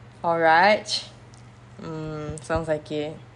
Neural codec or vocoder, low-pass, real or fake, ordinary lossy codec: none; 10.8 kHz; real; none